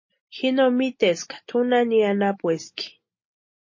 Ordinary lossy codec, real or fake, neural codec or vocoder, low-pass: MP3, 32 kbps; real; none; 7.2 kHz